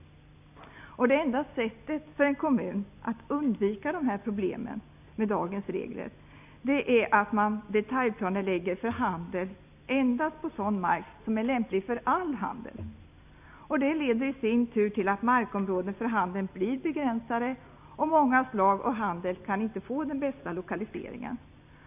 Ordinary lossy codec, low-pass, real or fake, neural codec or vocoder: AAC, 32 kbps; 3.6 kHz; real; none